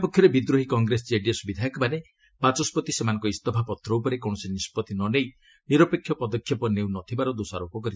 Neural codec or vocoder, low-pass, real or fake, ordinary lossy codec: none; none; real; none